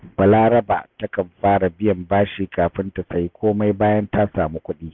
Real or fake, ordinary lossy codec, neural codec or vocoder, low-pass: real; none; none; none